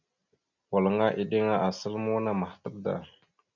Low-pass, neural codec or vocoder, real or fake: 7.2 kHz; none; real